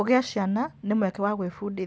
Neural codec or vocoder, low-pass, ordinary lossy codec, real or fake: none; none; none; real